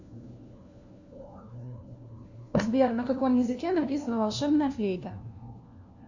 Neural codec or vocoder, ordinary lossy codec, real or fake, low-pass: codec, 16 kHz, 1 kbps, FunCodec, trained on LibriTTS, 50 frames a second; Opus, 64 kbps; fake; 7.2 kHz